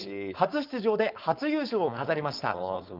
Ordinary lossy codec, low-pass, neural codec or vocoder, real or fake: Opus, 24 kbps; 5.4 kHz; codec, 16 kHz, 4.8 kbps, FACodec; fake